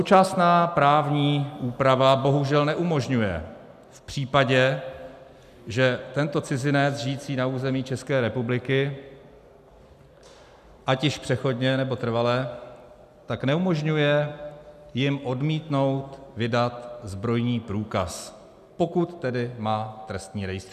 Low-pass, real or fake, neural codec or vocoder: 14.4 kHz; real; none